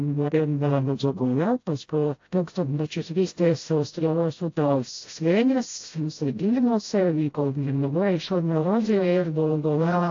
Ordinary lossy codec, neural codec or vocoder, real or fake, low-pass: AAC, 48 kbps; codec, 16 kHz, 0.5 kbps, FreqCodec, smaller model; fake; 7.2 kHz